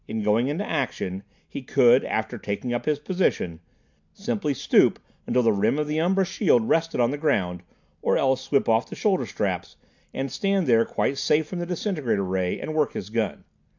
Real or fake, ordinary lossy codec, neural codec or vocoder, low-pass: real; MP3, 64 kbps; none; 7.2 kHz